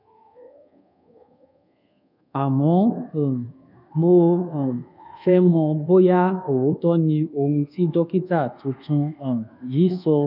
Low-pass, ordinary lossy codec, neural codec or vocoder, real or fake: 5.4 kHz; none; codec, 24 kHz, 1.2 kbps, DualCodec; fake